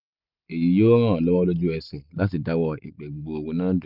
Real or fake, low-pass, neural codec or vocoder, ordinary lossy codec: real; 5.4 kHz; none; none